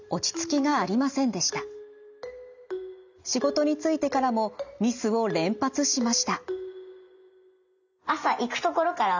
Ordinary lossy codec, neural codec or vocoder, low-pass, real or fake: none; none; 7.2 kHz; real